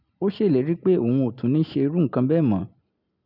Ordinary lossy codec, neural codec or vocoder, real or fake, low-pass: none; none; real; 5.4 kHz